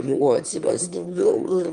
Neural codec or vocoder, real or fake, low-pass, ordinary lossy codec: autoencoder, 22.05 kHz, a latent of 192 numbers a frame, VITS, trained on one speaker; fake; 9.9 kHz; Opus, 32 kbps